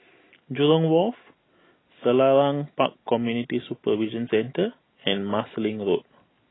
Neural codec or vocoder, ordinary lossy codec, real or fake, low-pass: none; AAC, 16 kbps; real; 7.2 kHz